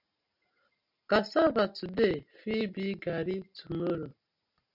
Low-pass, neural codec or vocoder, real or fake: 5.4 kHz; none; real